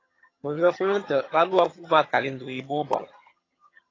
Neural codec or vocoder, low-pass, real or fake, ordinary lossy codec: vocoder, 22.05 kHz, 80 mel bands, HiFi-GAN; 7.2 kHz; fake; AAC, 32 kbps